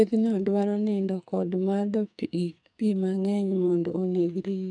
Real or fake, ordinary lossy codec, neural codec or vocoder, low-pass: fake; none; codec, 44.1 kHz, 2.6 kbps, SNAC; 9.9 kHz